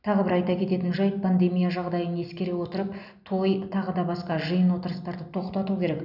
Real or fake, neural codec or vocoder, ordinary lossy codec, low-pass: real; none; none; 5.4 kHz